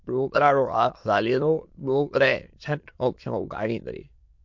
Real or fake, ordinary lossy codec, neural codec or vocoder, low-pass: fake; MP3, 48 kbps; autoencoder, 22.05 kHz, a latent of 192 numbers a frame, VITS, trained on many speakers; 7.2 kHz